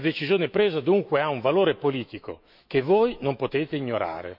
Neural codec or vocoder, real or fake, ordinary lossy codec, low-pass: autoencoder, 48 kHz, 128 numbers a frame, DAC-VAE, trained on Japanese speech; fake; MP3, 48 kbps; 5.4 kHz